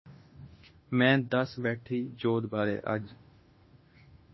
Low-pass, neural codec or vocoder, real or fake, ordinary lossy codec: 7.2 kHz; autoencoder, 48 kHz, 32 numbers a frame, DAC-VAE, trained on Japanese speech; fake; MP3, 24 kbps